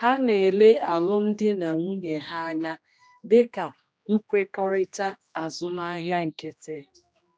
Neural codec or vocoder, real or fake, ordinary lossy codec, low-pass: codec, 16 kHz, 1 kbps, X-Codec, HuBERT features, trained on general audio; fake; none; none